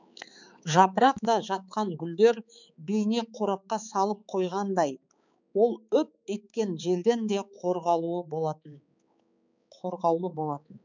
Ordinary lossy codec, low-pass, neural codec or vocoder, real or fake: none; 7.2 kHz; codec, 16 kHz, 4 kbps, X-Codec, HuBERT features, trained on balanced general audio; fake